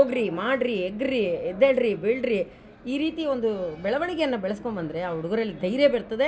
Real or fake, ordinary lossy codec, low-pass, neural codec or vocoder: real; none; none; none